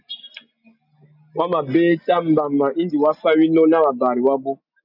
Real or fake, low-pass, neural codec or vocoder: real; 5.4 kHz; none